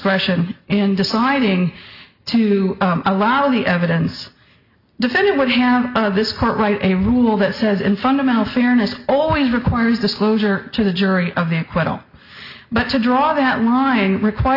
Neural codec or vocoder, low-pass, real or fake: none; 5.4 kHz; real